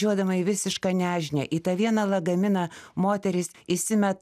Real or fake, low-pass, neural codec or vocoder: real; 14.4 kHz; none